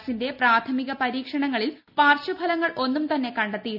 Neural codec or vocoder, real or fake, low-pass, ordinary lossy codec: none; real; 5.4 kHz; none